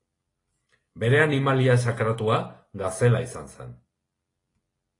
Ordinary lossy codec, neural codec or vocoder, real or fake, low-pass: AAC, 32 kbps; none; real; 10.8 kHz